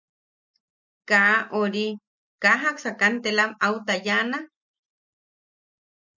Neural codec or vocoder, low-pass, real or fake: none; 7.2 kHz; real